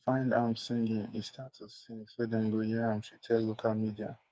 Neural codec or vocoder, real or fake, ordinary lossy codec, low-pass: codec, 16 kHz, 4 kbps, FreqCodec, smaller model; fake; none; none